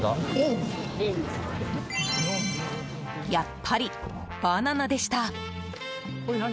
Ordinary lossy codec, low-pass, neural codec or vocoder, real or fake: none; none; none; real